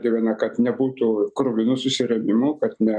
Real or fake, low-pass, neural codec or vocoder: real; 9.9 kHz; none